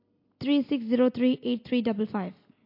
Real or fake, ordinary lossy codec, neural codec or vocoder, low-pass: real; MP3, 32 kbps; none; 5.4 kHz